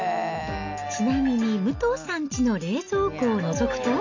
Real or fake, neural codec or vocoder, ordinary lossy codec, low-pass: real; none; none; 7.2 kHz